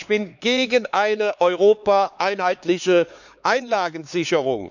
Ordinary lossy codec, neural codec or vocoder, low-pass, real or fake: none; codec, 16 kHz, 4 kbps, X-Codec, HuBERT features, trained on LibriSpeech; 7.2 kHz; fake